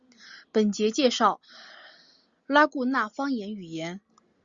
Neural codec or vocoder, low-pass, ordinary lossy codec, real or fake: none; 7.2 kHz; Opus, 64 kbps; real